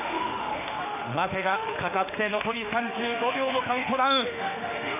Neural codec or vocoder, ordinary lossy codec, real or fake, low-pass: autoencoder, 48 kHz, 32 numbers a frame, DAC-VAE, trained on Japanese speech; none; fake; 3.6 kHz